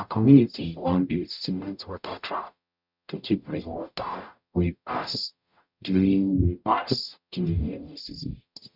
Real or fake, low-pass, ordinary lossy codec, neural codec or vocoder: fake; 5.4 kHz; none; codec, 44.1 kHz, 0.9 kbps, DAC